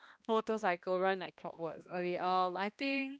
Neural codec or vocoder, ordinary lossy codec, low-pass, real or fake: codec, 16 kHz, 1 kbps, X-Codec, HuBERT features, trained on balanced general audio; none; none; fake